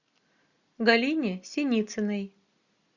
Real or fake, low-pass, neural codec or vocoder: real; 7.2 kHz; none